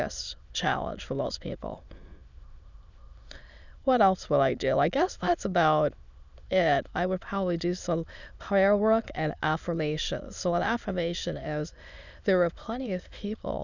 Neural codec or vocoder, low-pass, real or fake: autoencoder, 22.05 kHz, a latent of 192 numbers a frame, VITS, trained on many speakers; 7.2 kHz; fake